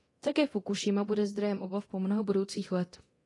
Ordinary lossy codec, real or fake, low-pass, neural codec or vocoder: AAC, 32 kbps; fake; 10.8 kHz; codec, 24 kHz, 0.9 kbps, DualCodec